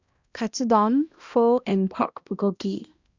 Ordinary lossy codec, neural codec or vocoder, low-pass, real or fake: Opus, 64 kbps; codec, 16 kHz, 1 kbps, X-Codec, HuBERT features, trained on balanced general audio; 7.2 kHz; fake